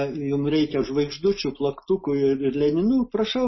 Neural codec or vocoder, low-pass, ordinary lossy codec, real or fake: none; 7.2 kHz; MP3, 24 kbps; real